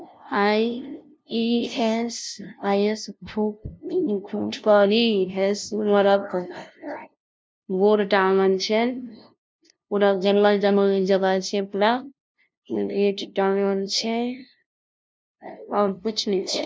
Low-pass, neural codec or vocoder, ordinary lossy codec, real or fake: none; codec, 16 kHz, 0.5 kbps, FunCodec, trained on LibriTTS, 25 frames a second; none; fake